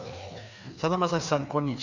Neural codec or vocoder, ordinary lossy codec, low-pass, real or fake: codec, 16 kHz, 2 kbps, FreqCodec, larger model; none; 7.2 kHz; fake